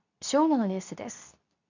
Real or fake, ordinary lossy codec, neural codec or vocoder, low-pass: fake; none; codec, 24 kHz, 0.9 kbps, WavTokenizer, medium speech release version 2; 7.2 kHz